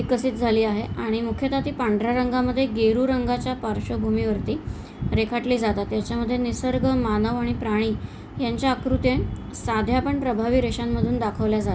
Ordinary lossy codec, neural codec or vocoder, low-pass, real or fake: none; none; none; real